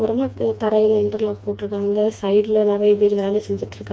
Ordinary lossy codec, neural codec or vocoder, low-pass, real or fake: none; codec, 16 kHz, 2 kbps, FreqCodec, smaller model; none; fake